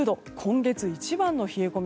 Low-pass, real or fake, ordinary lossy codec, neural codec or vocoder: none; real; none; none